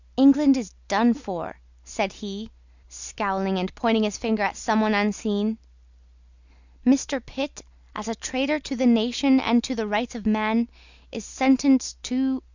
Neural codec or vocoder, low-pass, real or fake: none; 7.2 kHz; real